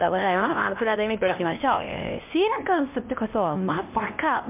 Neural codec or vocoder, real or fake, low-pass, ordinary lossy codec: codec, 16 kHz, 1 kbps, X-Codec, HuBERT features, trained on LibriSpeech; fake; 3.6 kHz; MP3, 32 kbps